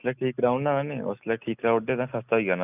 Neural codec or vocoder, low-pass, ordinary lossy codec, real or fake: none; 3.6 kHz; none; real